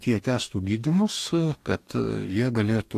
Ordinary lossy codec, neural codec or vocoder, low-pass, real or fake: AAC, 64 kbps; codec, 44.1 kHz, 2.6 kbps, DAC; 14.4 kHz; fake